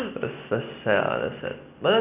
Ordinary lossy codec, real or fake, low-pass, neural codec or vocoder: none; real; 3.6 kHz; none